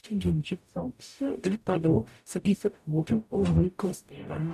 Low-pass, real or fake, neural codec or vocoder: 14.4 kHz; fake; codec, 44.1 kHz, 0.9 kbps, DAC